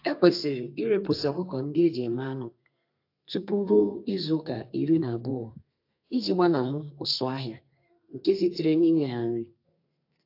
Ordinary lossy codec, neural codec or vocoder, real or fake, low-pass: AAC, 32 kbps; codec, 32 kHz, 1.9 kbps, SNAC; fake; 5.4 kHz